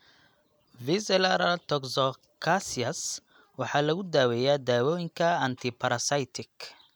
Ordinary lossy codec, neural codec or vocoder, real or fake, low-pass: none; none; real; none